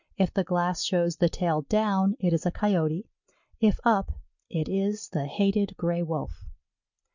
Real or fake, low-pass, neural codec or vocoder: real; 7.2 kHz; none